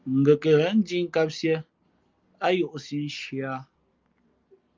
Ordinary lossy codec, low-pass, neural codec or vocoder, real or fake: Opus, 32 kbps; 7.2 kHz; none; real